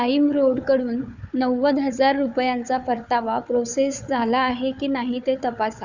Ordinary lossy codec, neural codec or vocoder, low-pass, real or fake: none; codec, 16 kHz, 4 kbps, FunCodec, trained on Chinese and English, 50 frames a second; 7.2 kHz; fake